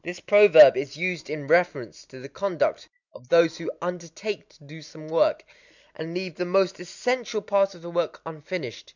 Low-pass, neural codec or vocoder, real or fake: 7.2 kHz; none; real